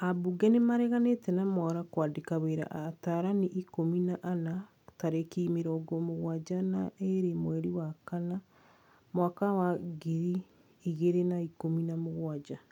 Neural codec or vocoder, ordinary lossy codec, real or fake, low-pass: none; none; real; 19.8 kHz